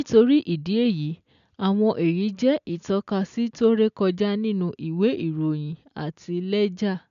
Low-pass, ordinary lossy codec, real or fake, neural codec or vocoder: 7.2 kHz; none; real; none